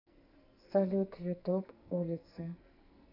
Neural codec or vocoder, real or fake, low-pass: codec, 44.1 kHz, 2.6 kbps, SNAC; fake; 5.4 kHz